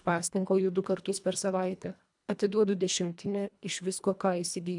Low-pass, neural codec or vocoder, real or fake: 10.8 kHz; codec, 24 kHz, 1.5 kbps, HILCodec; fake